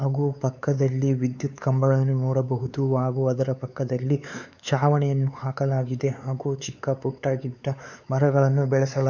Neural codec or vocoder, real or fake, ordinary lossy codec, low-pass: codec, 16 kHz, 4 kbps, X-Codec, WavLM features, trained on Multilingual LibriSpeech; fake; none; 7.2 kHz